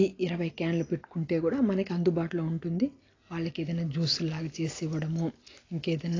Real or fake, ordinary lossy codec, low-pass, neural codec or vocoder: real; AAC, 32 kbps; 7.2 kHz; none